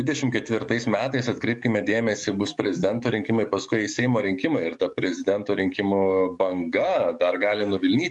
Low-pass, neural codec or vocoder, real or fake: 10.8 kHz; codec, 44.1 kHz, 7.8 kbps, DAC; fake